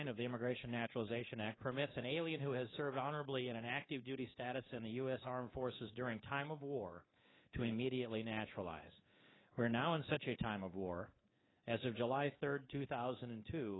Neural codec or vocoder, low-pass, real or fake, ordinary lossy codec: codec, 24 kHz, 3.1 kbps, DualCodec; 7.2 kHz; fake; AAC, 16 kbps